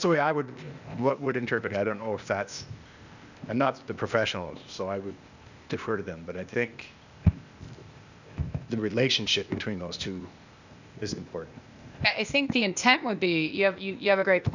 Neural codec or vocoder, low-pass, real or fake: codec, 16 kHz, 0.8 kbps, ZipCodec; 7.2 kHz; fake